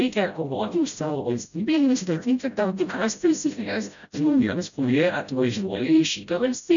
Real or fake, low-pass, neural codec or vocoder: fake; 7.2 kHz; codec, 16 kHz, 0.5 kbps, FreqCodec, smaller model